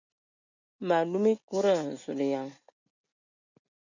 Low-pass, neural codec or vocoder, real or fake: 7.2 kHz; none; real